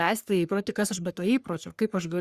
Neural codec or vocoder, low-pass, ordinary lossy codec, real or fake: codec, 44.1 kHz, 3.4 kbps, Pupu-Codec; 14.4 kHz; Opus, 64 kbps; fake